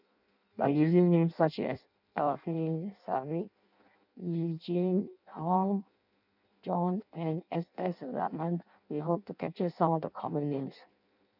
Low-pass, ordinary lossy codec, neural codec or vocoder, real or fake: 5.4 kHz; none; codec, 16 kHz in and 24 kHz out, 0.6 kbps, FireRedTTS-2 codec; fake